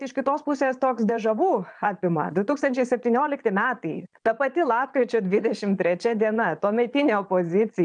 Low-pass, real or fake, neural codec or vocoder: 9.9 kHz; fake; vocoder, 22.05 kHz, 80 mel bands, Vocos